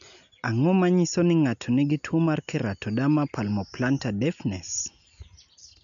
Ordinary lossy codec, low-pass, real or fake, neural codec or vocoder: none; 7.2 kHz; real; none